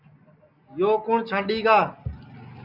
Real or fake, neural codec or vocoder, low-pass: real; none; 5.4 kHz